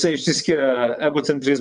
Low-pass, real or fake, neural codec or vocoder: 9.9 kHz; fake; vocoder, 22.05 kHz, 80 mel bands, WaveNeXt